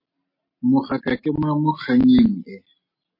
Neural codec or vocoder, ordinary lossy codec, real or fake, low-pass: none; MP3, 32 kbps; real; 5.4 kHz